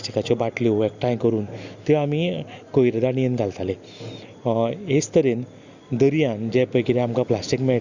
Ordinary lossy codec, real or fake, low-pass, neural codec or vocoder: Opus, 64 kbps; real; 7.2 kHz; none